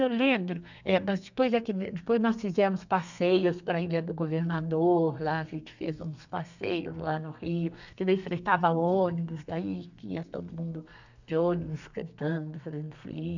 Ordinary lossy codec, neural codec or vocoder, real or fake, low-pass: none; codec, 32 kHz, 1.9 kbps, SNAC; fake; 7.2 kHz